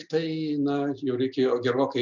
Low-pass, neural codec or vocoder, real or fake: 7.2 kHz; none; real